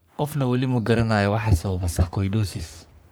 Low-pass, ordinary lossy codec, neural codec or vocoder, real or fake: none; none; codec, 44.1 kHz, 3.4 kbps, Pupu-Codec; fake